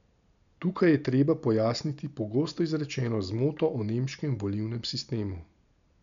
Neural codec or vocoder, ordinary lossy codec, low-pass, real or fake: none; none; 7.2 kHz; real